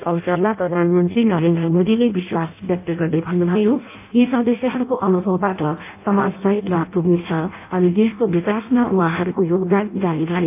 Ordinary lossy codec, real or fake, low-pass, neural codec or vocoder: AAC, 32 kbps; fake; 3.6 kHz; codec, 16 kHz in and 24 kHz out, 0.6 kbps, FireRedTTS-2 codec